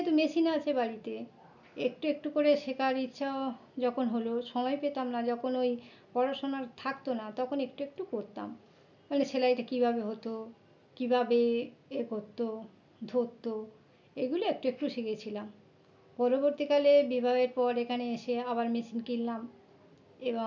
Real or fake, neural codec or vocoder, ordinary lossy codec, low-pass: real; none; none; 7.2 kHz